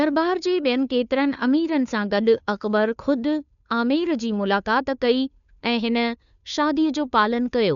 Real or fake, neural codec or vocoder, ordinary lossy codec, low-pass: fake; codec, 16 kHz, 2 kbps, FunCodec, trained on Chinese and English, 25 frames a second; none; 7.2 kHz